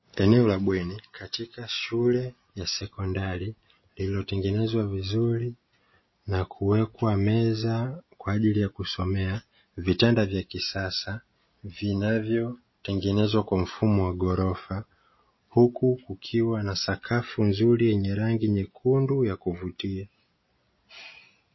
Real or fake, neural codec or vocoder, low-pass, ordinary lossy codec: real; none; 7.2 kHz; MP3, 24 kbps